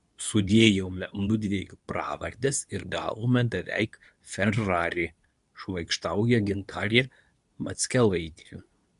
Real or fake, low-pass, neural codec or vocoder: fake; 10.8 kHz; codec, 24 kHz, 0.9 kbps, WavTokenizer, medium speech release version 2